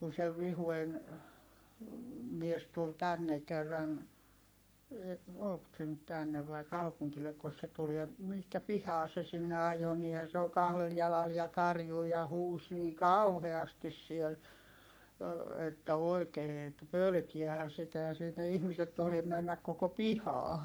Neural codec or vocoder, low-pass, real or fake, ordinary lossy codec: codec, 44.1 kHz, 3.4 kbps, Pupu-Codec; none; fake; none